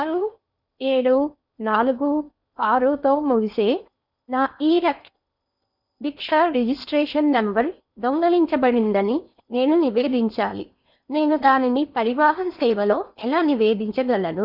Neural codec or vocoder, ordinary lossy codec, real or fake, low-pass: codec, 16 kHz in and 24 kHz out, 0.8 kbps, FocalCodec, streaming, 65536 codes; none; fake; 5.4 kHz